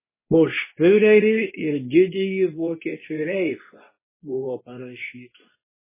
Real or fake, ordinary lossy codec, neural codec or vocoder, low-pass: fake; MP3, 16 kbps; codec, 24 kHz, 0.9 kbps, WavTokenizer, medium speech release version 2; 3.6 kHz